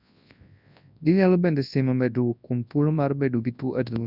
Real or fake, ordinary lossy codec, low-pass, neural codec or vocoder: fake; none; 5.4 kHz; codec, 24 kHz, 0.9 kbps, WavTokenizer, large speech release